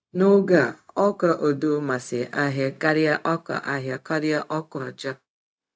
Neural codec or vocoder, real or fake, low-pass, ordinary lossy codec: codec, 16 kHz, 0.4 kbps, LongCat-Audio-Codec; fake; none; none